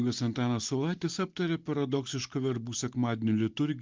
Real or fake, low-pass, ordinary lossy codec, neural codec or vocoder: real; 7.2 kHz; Opus, 32 kbps; none